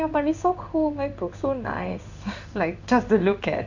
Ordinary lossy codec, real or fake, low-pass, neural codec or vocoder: none; fake; 7.2 kHz; codec, 16 kHz in and 24 kHz out, 1 kbps, XY-Tokenizer